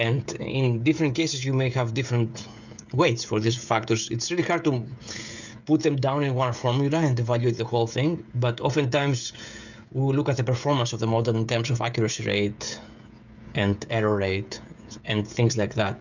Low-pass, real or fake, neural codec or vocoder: 7.2 kHz; fake; codec, 16 kHz, 16 kbps, FreqCodec, smaller model